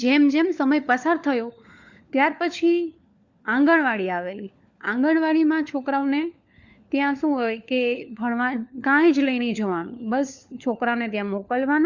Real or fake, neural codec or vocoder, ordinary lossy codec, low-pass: fake; codec, 16 kHz, 4 kbps, FunCodec, trained on LibriTTS, 50 frames a second; Opus, 64 kbps; 7.2 kHz